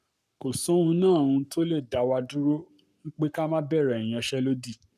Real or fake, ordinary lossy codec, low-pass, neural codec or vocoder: fake; none; 14.4 kHz; codec, 44.1 kHz, 7.8 kbps, Pupu-Codec